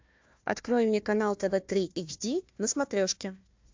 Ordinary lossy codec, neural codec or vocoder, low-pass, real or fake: MP3, 64 kbps; codec, 16 kHz, 1 kbps, FunCodec, trained on Chinese and English, 50 frames a second; 7.2 kHz; fake